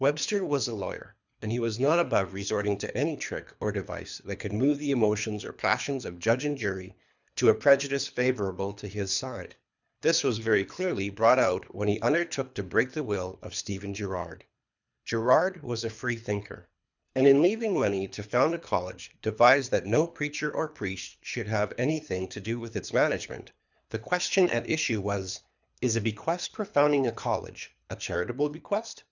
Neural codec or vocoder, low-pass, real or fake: codec, 24 kHz, 3 kbps, HILCodec; 7.2 kHz; fake